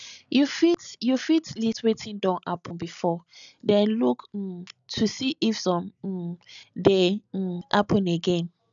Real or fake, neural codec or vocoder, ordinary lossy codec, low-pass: real; none; none; 7.2 kHz